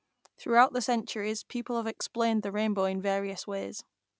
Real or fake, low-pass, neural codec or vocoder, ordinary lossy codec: real; none; none; none